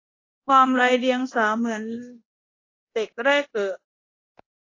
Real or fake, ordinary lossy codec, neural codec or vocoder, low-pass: fake; AAC, 32 kbps; codec, 24 kHz, 0.9 kbps, DualCodec; 7.2 kHz